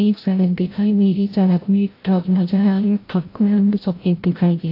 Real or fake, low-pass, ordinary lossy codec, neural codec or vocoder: fake; 5.4 kHz; AAC, 32 kbps; codec, 16 kHz, 0.5 kbps, FreqCodec, larger model